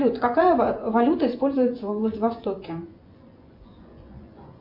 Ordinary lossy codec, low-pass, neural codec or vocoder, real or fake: MP3, 48 kbps; 5.4 kHz; vocoder, 24 kHz, 100 mel bands, Vocos; fake